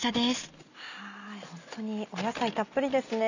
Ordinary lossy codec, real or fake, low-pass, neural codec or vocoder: none; real; 7.2 kHz; none